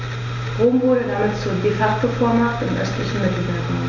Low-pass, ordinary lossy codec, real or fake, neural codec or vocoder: 7.2 kHz; none; real; none